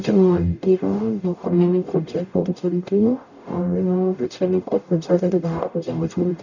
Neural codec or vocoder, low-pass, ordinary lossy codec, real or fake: codec, 44.1 kHz, 0.9 kbps, DAC; 7.2 kHz; none; fake